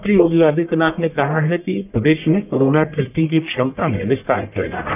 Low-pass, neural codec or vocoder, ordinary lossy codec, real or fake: 3.6 kHz; codec, 44.1 kHz, 1.7 kbps, Pupu-Codec; none; fake